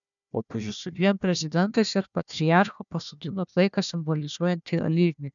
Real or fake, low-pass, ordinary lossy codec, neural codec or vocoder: fake; 7.2 kHz; MP3, 96 kbps; codec, 16 kHz, 1 kbps, FunCodec, trained on Chinese and English, 50 frames a second